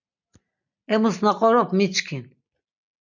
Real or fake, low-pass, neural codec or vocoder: real; 7.2 kHz; none